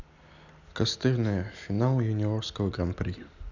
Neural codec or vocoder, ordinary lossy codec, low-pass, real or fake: none; none; 7.2 kHz; real